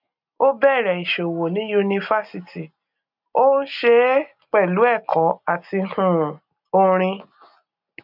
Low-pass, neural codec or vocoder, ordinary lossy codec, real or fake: 5.4 kHz; none; none; real